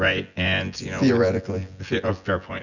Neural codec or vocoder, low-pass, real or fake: vocoder, 24 kHz, 100 mel bands, Vocos; 7.2 kHz; fake